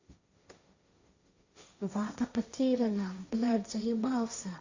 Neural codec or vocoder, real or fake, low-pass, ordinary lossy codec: codec, 16 kHz, 1.1 kbps, Voila-Tokenizer; fake; 7.2 kHz; Opus, 64 kbps